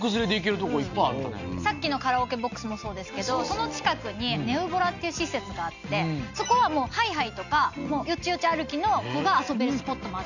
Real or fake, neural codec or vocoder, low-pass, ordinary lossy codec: real; none; 7.2 kHz; none